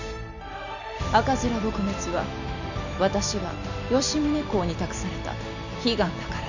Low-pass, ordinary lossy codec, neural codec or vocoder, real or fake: 7.2 kHz; none; none; real